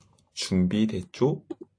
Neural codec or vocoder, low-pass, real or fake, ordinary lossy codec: none; 9.9 kHz; real; AAC, 48 kbps